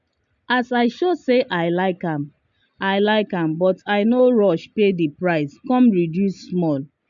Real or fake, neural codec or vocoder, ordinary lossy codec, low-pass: real; none; MP3, 64 kbps; 7.2 kHz